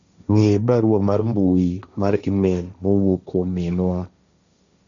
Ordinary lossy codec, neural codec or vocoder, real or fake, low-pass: none; codec, 16 kHz, 1.1 kbps, Voila-Tokenizer; fake; 7.2 kHz